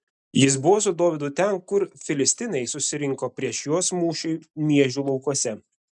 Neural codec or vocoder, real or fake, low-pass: none; real; 10.8 kHz